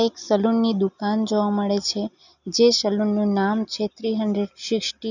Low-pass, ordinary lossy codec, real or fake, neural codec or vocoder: 7.2 kHz; none; real; none